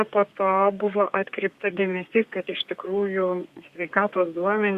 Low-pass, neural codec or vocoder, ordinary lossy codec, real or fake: 14.4 kHz; codec, 32 kHz, 1.9 kbps, SNAC; Opus, 64 kbps; fake